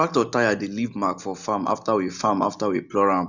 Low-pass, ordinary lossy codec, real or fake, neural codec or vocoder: 7.2 kHz; Opus, 64 kbps; real; none